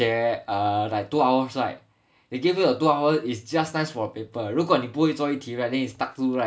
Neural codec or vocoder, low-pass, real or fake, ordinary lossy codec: none; none; real; none